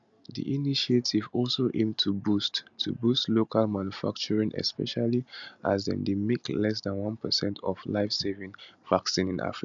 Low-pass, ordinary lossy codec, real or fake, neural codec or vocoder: 7.2 kHz; none; real; none